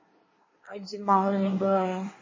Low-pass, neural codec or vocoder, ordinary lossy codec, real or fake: 7.2 kHz; codec, 24 kHz, 1 kbps, SNAC; MP3, 32 kbps; fake